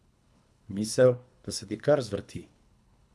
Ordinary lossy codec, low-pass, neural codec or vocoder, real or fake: none; none; codec, 24 kHz, 3 kbps, HILCodec; fake